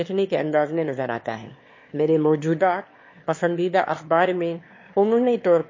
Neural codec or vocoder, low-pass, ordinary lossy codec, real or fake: autoencoder, 22.05 kHz, a latent of 192 numbers a frame, VITS, trained on one speaker; 7.2 kHz; MP3, 32 kbps; fake